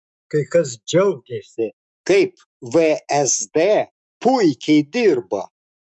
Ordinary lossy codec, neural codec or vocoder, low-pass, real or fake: MP3, 96 kbps; autoencoder, 48 kHz, 128 numbers a frame, DAC-VAE, trained on Japanese speech; 10.8 kHz; fake